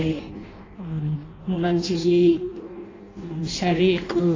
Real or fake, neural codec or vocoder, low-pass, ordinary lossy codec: fake; codec, 16 kHz in and 24 kHz out, 0.6 kbps, FireRedTTS-2 codec; 7.2 kHz; AAC, 32 kbps